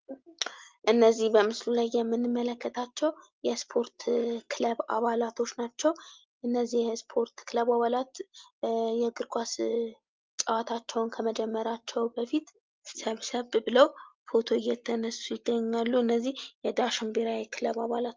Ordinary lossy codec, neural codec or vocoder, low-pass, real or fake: Opus, 32 kbps; none; 7.2 kHz; real